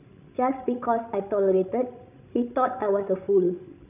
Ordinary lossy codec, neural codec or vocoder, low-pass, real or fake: none; codec, 16 kHz, 16 kbps, FreqCodec, larger model; 3.6 kHz; fake